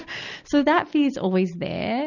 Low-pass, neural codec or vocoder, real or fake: 7.2 kHz; none; real